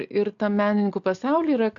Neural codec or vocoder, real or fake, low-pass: none; real; 7.2 kHz